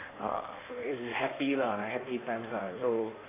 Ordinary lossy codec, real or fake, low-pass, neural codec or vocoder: AAC, 16 kbps; fake; 3.6 kHz; codec, 16 kHz in and 24 kHz out, 1.1 kbps, FireRedTTS-2 codec